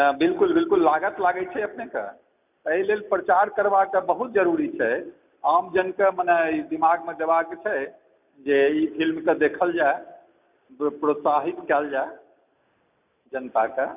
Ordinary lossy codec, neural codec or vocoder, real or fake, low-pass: none; none; real; 3.6 kHz